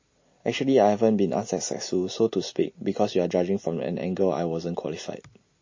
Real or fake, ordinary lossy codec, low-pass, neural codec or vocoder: real; MP3, 32 kbps; 7.2 kHz; none